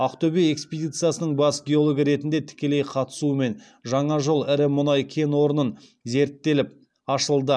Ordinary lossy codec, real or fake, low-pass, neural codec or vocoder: none; real; none; none